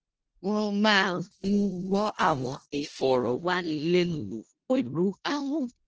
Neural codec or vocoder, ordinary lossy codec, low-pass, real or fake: codec, 16 kHz in and 24 kHz out, 0.4 kbps, LongCat-Audio-Codec, four codebook decoder; Opus, 16 kbps; 7.2 kHz; fake